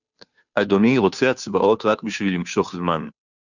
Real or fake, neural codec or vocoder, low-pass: fake; codec, 16 kHz, 2 kbps, FunCodec, trained on Chinese and English, 25 frames a second; 7.2 kHz